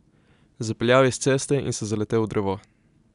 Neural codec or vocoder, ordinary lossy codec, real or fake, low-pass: none; none; real; 10.8 kHz